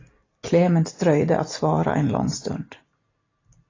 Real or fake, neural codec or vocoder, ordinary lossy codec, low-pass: real; none; AAC, 32 kbps; 7.2 kHz